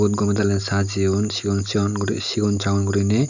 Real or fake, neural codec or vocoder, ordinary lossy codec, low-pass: real; none; none; 7.2 kHz